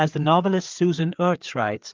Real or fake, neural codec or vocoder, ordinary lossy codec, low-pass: fake; codec, 16 kHz, 4 kbps, FreqCodec, larger model; Opus, 24 kbps; 7.2 kHz